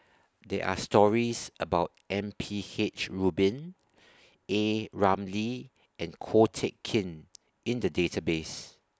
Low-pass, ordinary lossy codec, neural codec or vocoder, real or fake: none; none; none; real